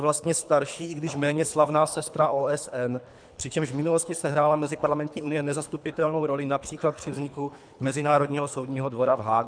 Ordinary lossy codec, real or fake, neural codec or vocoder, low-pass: MP3, 96 kbps; fake; codec, 24 kHz, 3 kbps, HILCodec; 9.9 kHz